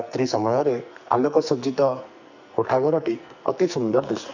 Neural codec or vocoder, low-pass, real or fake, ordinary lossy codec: codec, 44.1 kHz, 2.6 kbps, SNAC; 7.2 kHz; fake; none